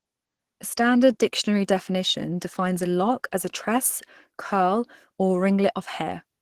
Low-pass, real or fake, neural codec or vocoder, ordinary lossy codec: 14.4 kHz; fake; codec, 44.1 kHz, 7.8 kbps, DAC; Opus, 16 kbps